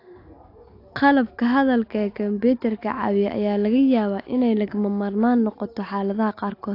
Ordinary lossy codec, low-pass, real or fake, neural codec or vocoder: AAC, 32 kbps; 5.4 kHz; real; none